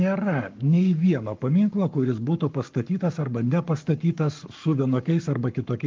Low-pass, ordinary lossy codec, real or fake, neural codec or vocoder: 7.2 kHz; Opus, 24 kbps; fake; codec, 44.1 kHz, 7.8 kbps, Pupu-Codec